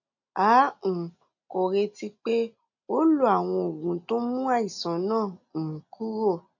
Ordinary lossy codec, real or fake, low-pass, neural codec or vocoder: none; real; 7.2 kHz; none